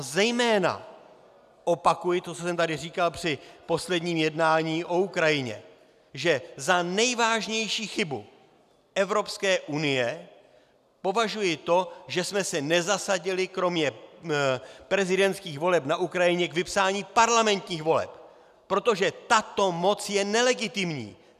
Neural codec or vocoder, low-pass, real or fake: none; 14.4 kHz; real